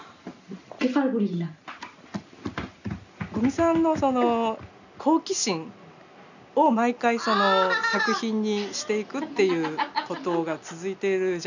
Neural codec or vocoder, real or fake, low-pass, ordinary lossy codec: none; real; 7.2 kHz; none